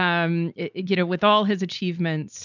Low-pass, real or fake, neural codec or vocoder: 7.2 kHz; real; none